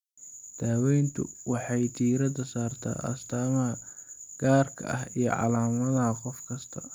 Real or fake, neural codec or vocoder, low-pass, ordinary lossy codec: real; none; 19.8 kHz; none